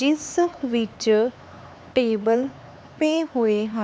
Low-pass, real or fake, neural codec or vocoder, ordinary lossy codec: none; fake; codec, 16 kHz, 4 kbps, X-Codec, HuBERT features, trained on LibriSpeech; none